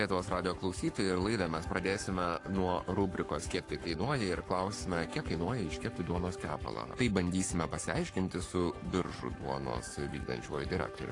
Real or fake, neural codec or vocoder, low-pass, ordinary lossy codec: fake; codec, 44.1 kHz, 7.8 kbps, Pupu-Codec; 10.8 kHz; AAC, 48 kbps